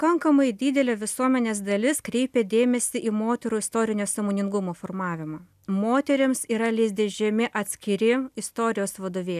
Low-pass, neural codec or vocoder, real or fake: 14.4 kHz; none; real